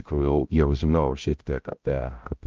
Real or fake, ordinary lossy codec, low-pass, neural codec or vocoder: fake; Opus, 24 kbps; 7.2 kHz; codec, 16 kHz, 0.5 kbps, X-Codec, HuBERT features, trained on balanced general audio